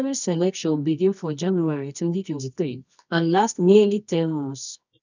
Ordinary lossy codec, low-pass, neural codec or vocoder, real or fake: none; 7.2 kHz; codec, 24 kHz, 0.9 kbps, WavTokenizer, medium music audio release; fake